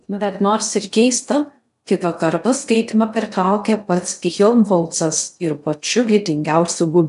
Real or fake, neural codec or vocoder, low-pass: fake; codec, 16 kHz in and 24 kHz out, 0.6 kbps, FocalCodec, streaming, 2048 codes; 10.8 kHz